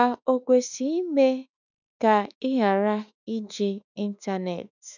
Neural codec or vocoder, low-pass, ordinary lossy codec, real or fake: autoencoder, 48 kHz, 32 numbers a frame, DAC-VAE, trained on Japanese speech; 7.2 kHz; none; fake